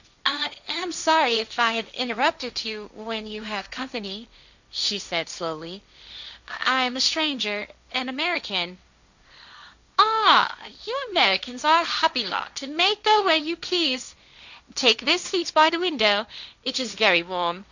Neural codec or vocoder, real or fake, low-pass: codec, 16 kHz, 1.1 kbps, Voila-Tokenizer; fake; 7.2 kHz